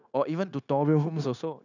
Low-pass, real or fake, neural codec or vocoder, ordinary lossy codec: 7.2 kHz; fake; codec, 16 kHz, 0.9 kbps, LongCat-Audio-Codec; none